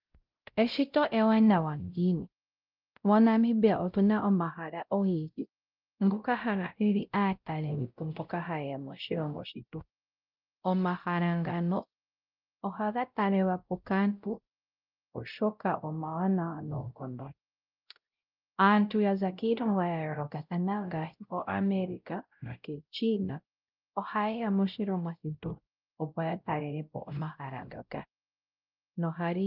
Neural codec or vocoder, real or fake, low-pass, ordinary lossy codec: codec, 16 kHz, 0.5 kbps, X-Codec, WavLM features, trained on Multilingual LibriSpeech; fake; 5.4 kHz; Opus, 24 kbps